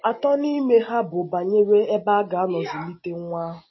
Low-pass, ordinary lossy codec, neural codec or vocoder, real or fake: 7.2 kHz; MP3, 24 kbps; none; real